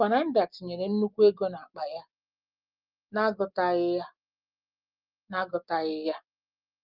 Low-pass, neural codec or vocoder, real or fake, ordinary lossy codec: 5.4 kHz; none; real; Opus, 24 kbps